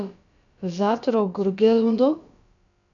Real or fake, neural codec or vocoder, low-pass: fake; codec, 16 kHz, about 1 kbps, DyCAST, with the encoder's durations; 7.2 kHz